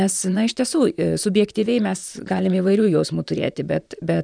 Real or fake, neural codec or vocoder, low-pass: fake; vocoder, 44.1 kHz, 128 mel bands, Pupu-Vocoder; 9.9 kHz